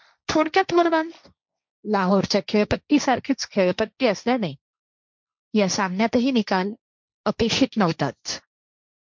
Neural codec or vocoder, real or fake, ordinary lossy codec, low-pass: codec, 16 kHz, 1.1 kbps, Voila-Tokenizer; fake; MP3, 64 kbps; 7.2 kHz